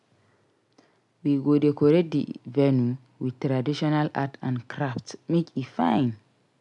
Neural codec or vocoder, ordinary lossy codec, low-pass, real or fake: none; none; 10.8 kHz; real